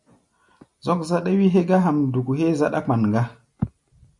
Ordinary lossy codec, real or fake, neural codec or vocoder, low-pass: MP3, 48 kbps; real; none; 10.8 kHz